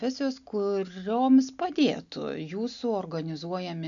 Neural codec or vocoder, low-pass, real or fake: none; 7.2 kHz; real